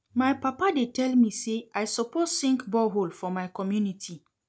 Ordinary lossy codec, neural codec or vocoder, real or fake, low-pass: none; none; real; none